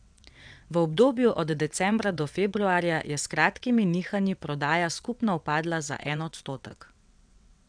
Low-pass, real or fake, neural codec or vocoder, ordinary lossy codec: 9.9 kHz; fake; vocoder, 22.05 kHz, 80 mel bands, WaveNeXt; none